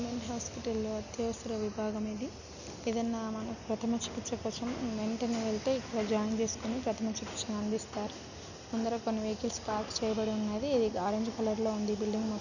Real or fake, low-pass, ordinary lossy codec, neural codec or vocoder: real; 7.2 kHz; none; none